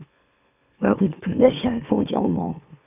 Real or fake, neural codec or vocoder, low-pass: fake; autoencoder, 44.1 kHz, a latent of 192 numbers a frame, MeloTTS; 3.6 kHz